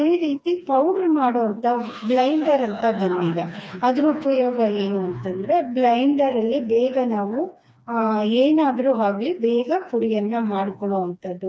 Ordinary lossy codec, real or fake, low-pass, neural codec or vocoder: none; fake; none; codec, 16 kHz, 2 kbps, FreqCodec, smaller model